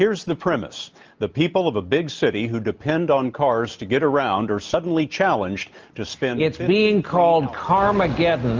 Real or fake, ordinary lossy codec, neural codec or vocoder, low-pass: real; Opus, 24 kbps; none; 7.2 kHz